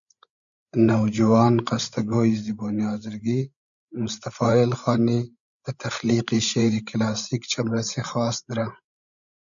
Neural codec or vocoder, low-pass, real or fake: codec, 16 kHz, 16 kbps, FreqCodec, larger model; 7.2 kHz; fake